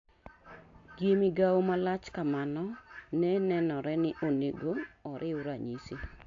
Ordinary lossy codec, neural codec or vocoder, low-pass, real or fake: AAC, 48 kbps; none; 7.2 kHz; real